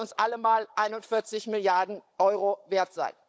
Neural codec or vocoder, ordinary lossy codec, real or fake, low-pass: codec, 16 kHz, 16 kbps, FunCodec, trained on Chinese and English, 50 frames a second; none; fake; none